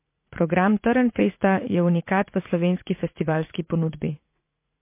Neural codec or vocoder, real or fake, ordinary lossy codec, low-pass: vocoder, 44.1 kHz, 80 mel bands, Vocos; fake; MP3, 24 kbps; 3.6 kHz